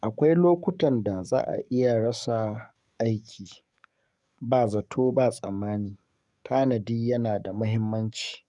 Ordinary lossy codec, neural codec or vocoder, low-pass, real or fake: none; codec, 44.1 kHz, 7.8 kbps, DAC; 10.8 kHz; fake